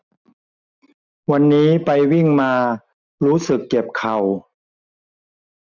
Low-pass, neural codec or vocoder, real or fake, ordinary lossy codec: 7.2 kHz; none; real; none